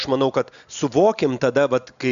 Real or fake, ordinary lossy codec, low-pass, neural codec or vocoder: real; AAC, 96 kbps; 7.2 kHz; none